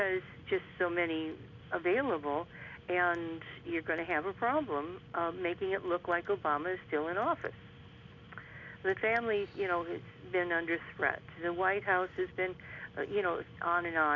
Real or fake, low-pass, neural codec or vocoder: real; 7.2 kHz; none